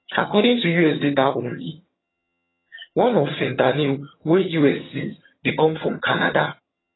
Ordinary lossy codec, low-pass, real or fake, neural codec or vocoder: AAC, 16 kbps; 7.2 kHz; fake; vocoder, 22.05 kHz, 80 mel bands, HiFi-GAN